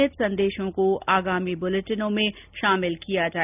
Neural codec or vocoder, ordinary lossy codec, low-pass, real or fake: none; none; 3.6 kHz; real